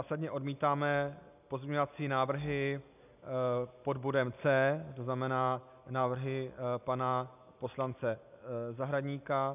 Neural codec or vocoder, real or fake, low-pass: none; real; 3.6 kHz